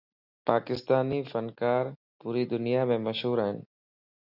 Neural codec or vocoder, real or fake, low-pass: none; real; 5.4 kHz